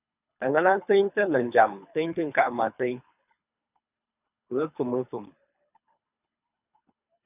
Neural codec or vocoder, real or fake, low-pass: codec, 24 kHz, 3 kbps, HILCodec; fake; 3.6 kHz